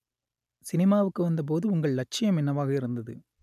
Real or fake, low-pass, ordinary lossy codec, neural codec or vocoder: real; 14.4 kHz; none; none